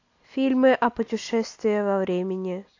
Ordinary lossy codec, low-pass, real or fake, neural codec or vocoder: AAC, 48 kbps; 7.2 kHz; real; none